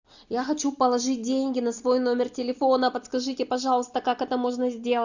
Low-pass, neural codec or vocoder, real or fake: 7.2 kHz; none; real